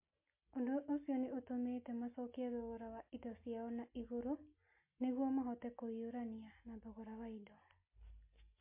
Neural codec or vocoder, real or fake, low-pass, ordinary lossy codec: none; real; 3.6 kHz; MP3, 24 kbps